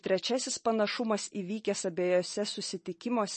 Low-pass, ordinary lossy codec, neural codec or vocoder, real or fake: 10.8 kHz; MP3, 32 kbps; none; real